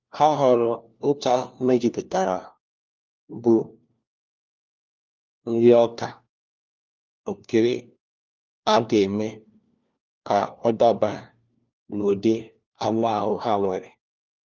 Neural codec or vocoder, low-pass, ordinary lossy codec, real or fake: codec, 16 kHz, 1 kbps, FunCodec, trained on LibriTTS, 50 frames a second; 7.2 kHz; Opus, 24 kbps; fake